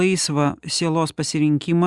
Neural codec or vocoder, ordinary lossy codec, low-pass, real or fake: none; Opus, 64 kbps; 10.8 kHz; real